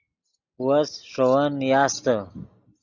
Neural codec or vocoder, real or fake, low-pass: none; real; 7.2 kHz